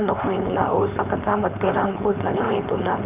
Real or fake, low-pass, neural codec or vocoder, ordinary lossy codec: fake; 3.6 kHz; codec, 16 kHz, 4.8 kbps, FACodec; none